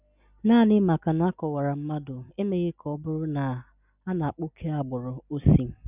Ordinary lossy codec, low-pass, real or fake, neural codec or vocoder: none; 3.6 kHz; real; none